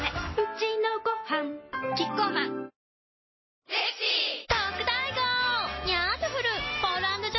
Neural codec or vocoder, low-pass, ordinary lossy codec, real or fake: none; 7.2 kHz; MP3, 24 kbps; real